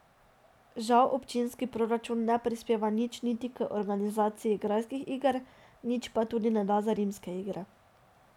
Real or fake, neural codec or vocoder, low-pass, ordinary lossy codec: real; none; 19.8 kHz; none